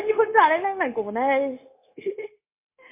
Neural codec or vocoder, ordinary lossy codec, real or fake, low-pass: codec, 16 kHz, 0.9 kbps, LongCat-Audio-Codec; MP3, 24 kbps; fake; 3.6 kHz